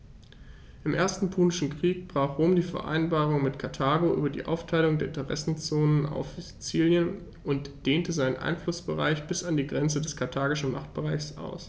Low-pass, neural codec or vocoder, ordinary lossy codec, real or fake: none; none; none; real